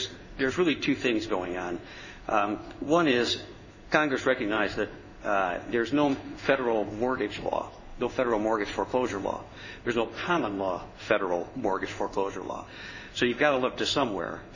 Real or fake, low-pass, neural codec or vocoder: fake; 7.2 kHz; codec, 16 kHz in and 24 kHz out, 1 kbps, XY-Tokenizer